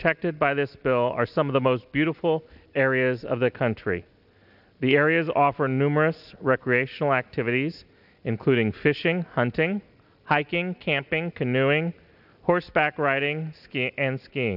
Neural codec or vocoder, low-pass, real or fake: none; 5.4 kHz; real